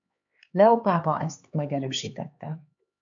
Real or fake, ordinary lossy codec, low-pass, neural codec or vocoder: fake; AAC, 64 kbps; 7.2 kHz; codec, 16 kHz, 4 kbps, X-Codec, HuBERT features, trained on LibriSpeech